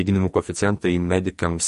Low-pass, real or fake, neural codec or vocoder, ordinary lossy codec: 14.4 kHz; fake; codec, 44.1 kHz, 2.6 kbps, SNAC; MP3, 48 kbps